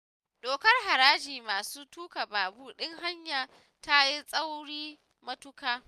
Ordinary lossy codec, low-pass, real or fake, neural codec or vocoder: none; 14.4 kHz; real; none